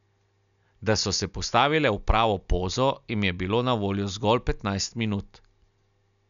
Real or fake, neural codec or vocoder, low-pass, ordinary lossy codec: real; none; 7.2 kHz; none